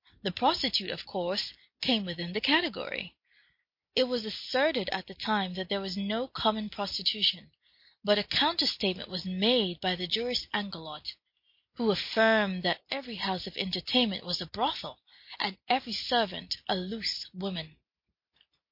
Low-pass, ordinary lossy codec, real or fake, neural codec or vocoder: 5.4 kHz; MP3, 32 kbps; real; none